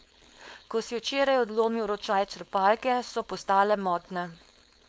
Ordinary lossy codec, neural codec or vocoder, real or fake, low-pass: none; codec, 16 kHz, 4.8 kbps, FACodec; fake; none